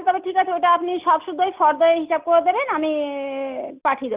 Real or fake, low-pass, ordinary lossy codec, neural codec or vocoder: real; 3.6 kHz; Opus, 32 kbps; none